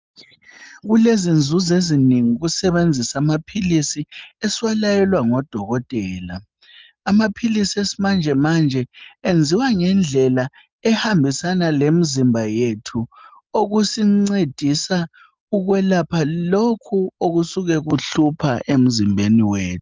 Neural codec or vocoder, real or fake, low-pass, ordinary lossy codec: none; real; 7.2 kHz; Opus, 32 kbps